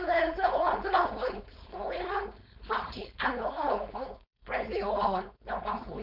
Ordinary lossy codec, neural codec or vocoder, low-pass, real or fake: none; codec, 16 kHz, 4.8 kbps, FACodec; 5.4 kHz; fake